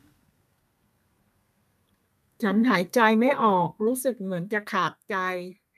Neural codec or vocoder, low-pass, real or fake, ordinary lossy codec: codec, 32 kHz, 1.9 kbps, SNAC; 14.4 kHz; fake; none